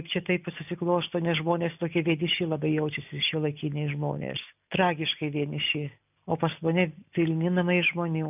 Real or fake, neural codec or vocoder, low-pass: real; none; 3.6 kHz